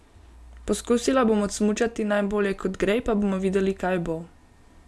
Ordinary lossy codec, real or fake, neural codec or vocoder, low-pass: none; real; none; none